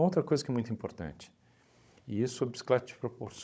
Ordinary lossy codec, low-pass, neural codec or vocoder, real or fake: none; none; codec, 16 kHz, 16 kbps, FunCodec, trained on Chinese and English, 50 frames a second; fake